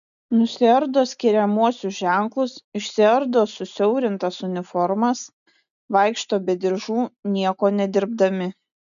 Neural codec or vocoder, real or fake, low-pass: none; real; 7.2 kHz